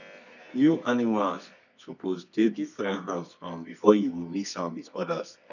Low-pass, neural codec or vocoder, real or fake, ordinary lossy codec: 7.2 kHz; codec, 24 kHz, 0.9 kbps, WavTokenizer, medium music audio release; fake; none